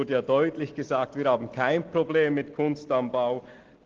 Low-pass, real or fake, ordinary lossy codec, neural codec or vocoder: 7.2 kHz; real; Opus, 16 kbps; none